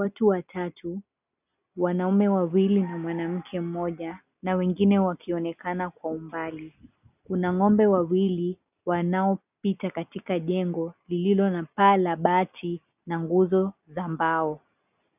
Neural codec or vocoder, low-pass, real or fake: none; 3.6 kHz; real